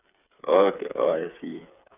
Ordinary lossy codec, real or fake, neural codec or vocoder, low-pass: none; fake; codec, 16 kHz, 8 kbps, FreqCodec, smaller model; 3.6 kHz